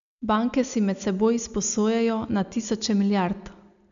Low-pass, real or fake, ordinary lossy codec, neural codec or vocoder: 7.2 kHz; real; none; none